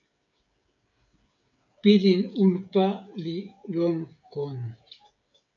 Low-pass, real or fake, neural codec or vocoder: 7.2 kHz; fake; codec, 16 kHz, 16 kbps, FreqCodec, smaller model